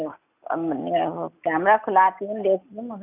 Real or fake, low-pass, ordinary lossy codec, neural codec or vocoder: real; 3.6 kHz; none; none